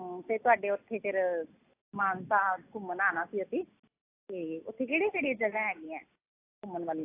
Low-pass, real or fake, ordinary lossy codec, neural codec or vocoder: 3.6 kHz; real; AAC, 24 kbps; none